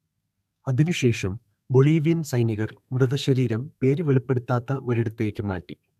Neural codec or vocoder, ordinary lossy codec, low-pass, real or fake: codec, 32 kHz, 1.9 kbps, SNAC; none; 14.4 kHz; fake